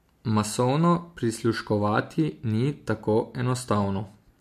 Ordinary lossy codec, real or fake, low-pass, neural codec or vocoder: MP3, 64 kbps; real; 14.4 kHz; none